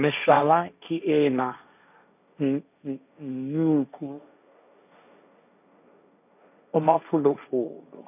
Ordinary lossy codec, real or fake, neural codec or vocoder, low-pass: none; fake; codec, 16 kHz, 1.1 kbps, Voila-Tokenizer; 3.6 kHz